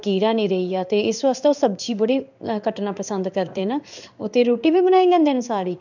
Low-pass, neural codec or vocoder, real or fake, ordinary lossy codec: 7.2 kHz; codec, 16 kHz in and 24 kHz out, 1 kbps, XY-Tokenizer; fake; none